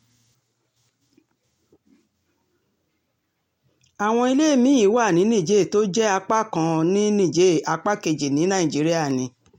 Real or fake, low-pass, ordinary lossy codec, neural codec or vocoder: real; 19.8 kHz; MP3, 64 kbps; none